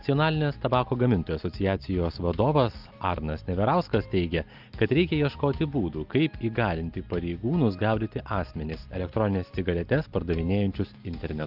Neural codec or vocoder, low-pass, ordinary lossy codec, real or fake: none; 5.4 kHz; Opus, 24 kbps; real